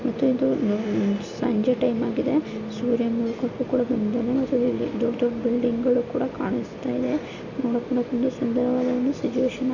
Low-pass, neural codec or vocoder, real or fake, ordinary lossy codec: 7.2 kHz; none; real; AAC, 48 kbps